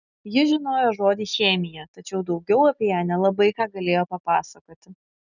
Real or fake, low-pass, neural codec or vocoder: real; 7.2 kHz; none